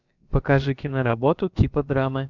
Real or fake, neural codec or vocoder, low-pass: fake; codec, 16 kHz, about 1 kbps, DyCAST, with the encoder's durations; 7.2 kHz